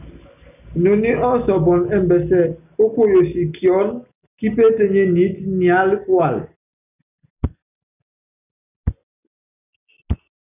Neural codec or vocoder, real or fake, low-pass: none; real; 3.6 kHz